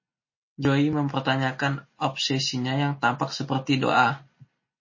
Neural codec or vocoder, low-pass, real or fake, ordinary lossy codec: none; 7.2 kHz; real; MP3, 32 kbps